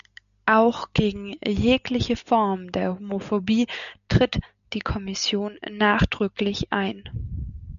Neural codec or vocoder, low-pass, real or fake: none; 7.2 kHz; real